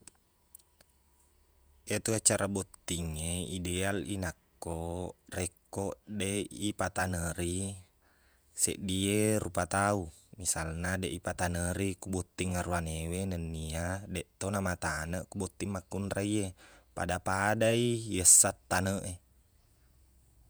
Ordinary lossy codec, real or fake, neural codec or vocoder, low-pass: none; fake; vocoder, 48 kHz, 128 mel bands, Vocos; none